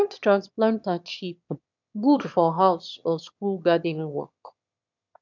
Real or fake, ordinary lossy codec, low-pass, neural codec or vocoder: fake; none; 7.2 kHz; autoencoder, 22.05 kHz, a latent of 192 numbers a frame, VITS, trained on one speaker